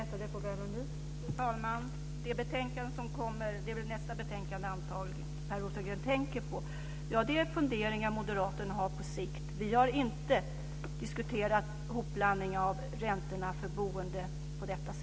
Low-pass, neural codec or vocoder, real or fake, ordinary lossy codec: none; none; real; none